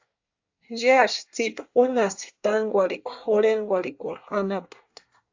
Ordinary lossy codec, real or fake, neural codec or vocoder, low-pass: AAC, 48 kbps; fake; codec, 24 kHz, 1 kbps, SNAC; 7.2 kHz